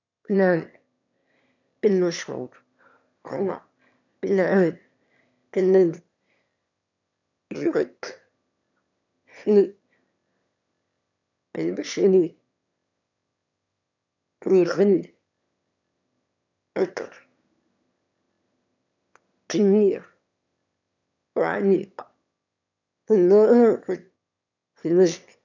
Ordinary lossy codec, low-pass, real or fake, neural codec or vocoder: none; 7.2 kHz; fake; autoencoder, 22.05 kHz, a latent of 192 numbers a frame, VITS, trained on one speaker